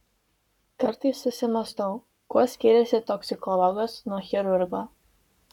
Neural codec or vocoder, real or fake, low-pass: codec, 44.1 kHz, 7.8 kbps, Pupu-Codec; fake; 19.8 kHz